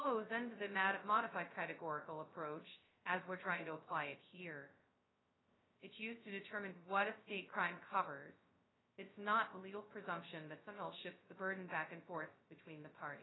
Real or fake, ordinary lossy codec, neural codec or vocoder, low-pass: fake; AAC, 16 kbps; codec, 16 kHz, 0.2 kbps, FocalCodec; 7.2 kHz